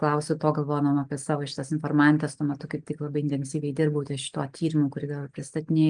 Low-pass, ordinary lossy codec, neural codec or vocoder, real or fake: 9.9 kHz; Opus, 32 kbps; none; real